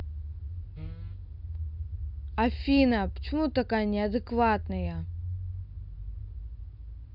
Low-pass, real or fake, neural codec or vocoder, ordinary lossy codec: 5.4 kHz; real; none; none